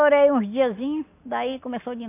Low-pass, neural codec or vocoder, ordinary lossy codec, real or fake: 3.6 kHz; none; AAC, 24 kbps; real